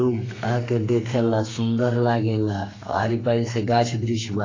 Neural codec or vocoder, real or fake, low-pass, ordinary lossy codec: codec, 32 kHz, 1.9 kbps, SNAC; fake; 7.2 kHz; AAC, 32 kbps